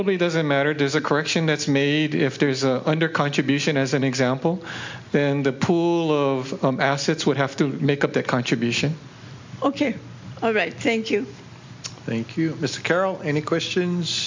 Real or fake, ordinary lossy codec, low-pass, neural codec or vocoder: real; MP3, 64 kbps; 7.2 kHz; none